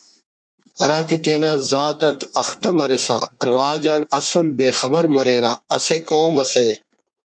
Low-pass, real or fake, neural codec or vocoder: 9.9 kHz; fake; codec, 24 kHz, 1 kbps, SNAC